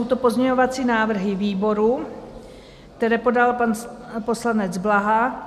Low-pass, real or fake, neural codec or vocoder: 14.4 kHz; real; none